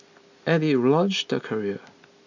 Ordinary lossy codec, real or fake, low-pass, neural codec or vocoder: none; real; 7.2 kHz; none